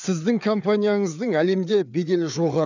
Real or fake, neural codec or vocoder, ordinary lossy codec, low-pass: fake; codec, 16 kHz, 4 kbps, FreqCodec, larger model; none; 7.2 kHz